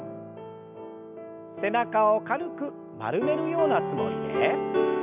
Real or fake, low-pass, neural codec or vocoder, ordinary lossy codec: real; 3.6 kHz; none; none